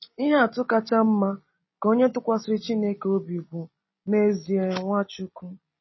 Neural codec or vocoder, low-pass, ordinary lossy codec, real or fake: none; 7.2 kHz; MP3, 24 kbps; real